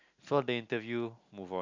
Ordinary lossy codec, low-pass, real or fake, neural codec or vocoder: MP3, 64 kbps; 7.2 kHz; real; none